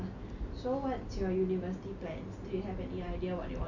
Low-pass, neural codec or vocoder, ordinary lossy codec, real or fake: 7.2 kHz; none; none; real